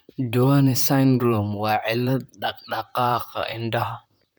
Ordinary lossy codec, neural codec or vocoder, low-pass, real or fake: none; vocoder, 44.1 kHz, 128 mel bands, Pupu-Vocoder; none; fake